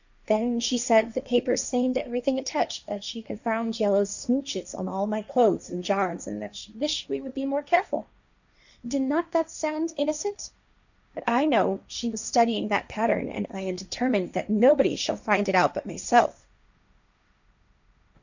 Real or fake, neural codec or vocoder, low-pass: fake; codec, 16 kHz, 1.1 kbps, Voila-Tokenizer; 7.2 kHz